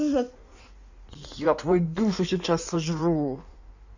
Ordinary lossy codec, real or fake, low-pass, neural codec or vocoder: none; fake; 7.2 kHz; codec, 16 kHz in and 24 kHz out, 1.1 kbps, FireRedTTS-2 codec